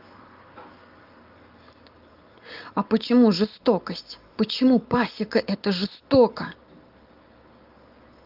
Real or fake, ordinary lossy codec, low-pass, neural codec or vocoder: real; Opus, 32 kbps; 5.4 kHz; none